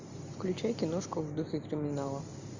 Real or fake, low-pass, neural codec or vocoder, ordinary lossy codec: real; 7.2 kHz; none; Opus, 64 kbps